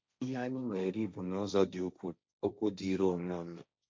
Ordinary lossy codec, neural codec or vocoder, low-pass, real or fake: none; codec, 16 kHz, 1.1 kbps, Voila-Tokenizer; none; fake